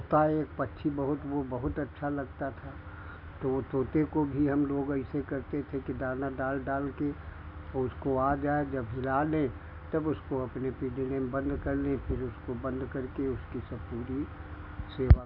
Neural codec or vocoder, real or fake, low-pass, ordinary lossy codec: none; real; 5.4 kHz; none